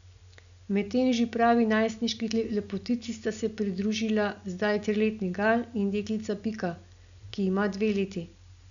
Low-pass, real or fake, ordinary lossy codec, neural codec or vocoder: 7.2 kHz; real; none; none